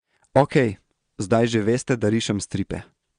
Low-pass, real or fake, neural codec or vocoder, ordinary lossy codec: 9.9 kHz; fake; vocoder, 22.05 kHz, 80 mel bands, Vocos; Opus, 64 kbps